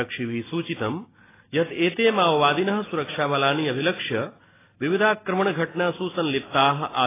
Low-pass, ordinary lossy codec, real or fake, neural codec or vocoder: 3.6 kHz; AAC, 16 kbps; real; none